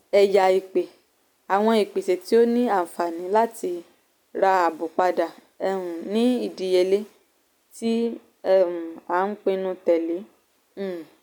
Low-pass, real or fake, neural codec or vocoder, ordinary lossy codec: none; real; none; none